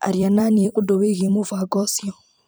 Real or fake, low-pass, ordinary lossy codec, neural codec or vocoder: fake; none; none; vocoder, 44.1 kHz, 128 mel bands every 256 samples, BigVGAN v2